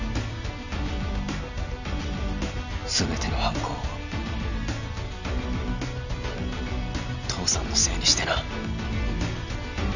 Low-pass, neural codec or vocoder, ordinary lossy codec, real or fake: 7.2 kHz; none; none; real